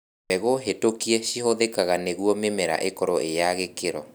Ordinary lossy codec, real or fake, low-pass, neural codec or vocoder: none; real; none; none